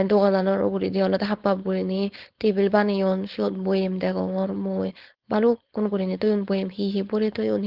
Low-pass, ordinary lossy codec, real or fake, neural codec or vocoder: 5.4 kHz; Opus, 16 kbps; fake; codec, 16 kHz, 4.8 kbps, FACodec